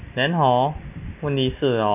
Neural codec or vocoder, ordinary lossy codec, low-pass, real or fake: none; none; 3.6 kHz; real